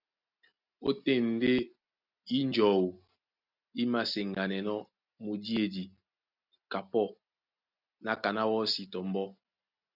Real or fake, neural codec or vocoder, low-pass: real; none; 5.4 kHz